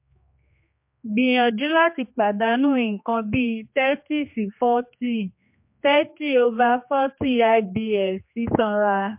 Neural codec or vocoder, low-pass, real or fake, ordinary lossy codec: codec, 16 kHz, 2 kbps, X-Codec, HuBERT features, trained on general audio; 3.6 kHz; fake; MP3, 32 kbps